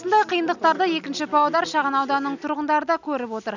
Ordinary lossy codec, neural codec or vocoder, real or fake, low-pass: none; none; real; 7.2 kHz